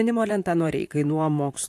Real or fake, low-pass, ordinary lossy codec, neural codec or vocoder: fake; 14.4 kHz; AAC, 64 kbps; vocoder, 44.1 kHz, 128 mel bands, Pupu-Vocoder